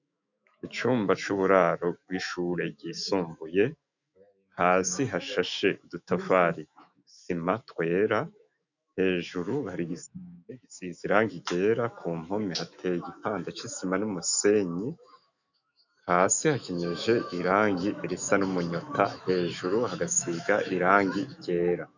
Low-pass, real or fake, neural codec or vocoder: 7.2 kHz; fake; autoencoder, 48 kHz, 128 numbers a frame, DAC-VAE, trained on Japanese speech